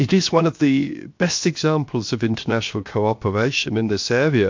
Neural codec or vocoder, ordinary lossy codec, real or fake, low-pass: codec, 16 kHz, 0.7 kbps, FocalCodec; MP3, 48 kbps; fake; 7.2 kHz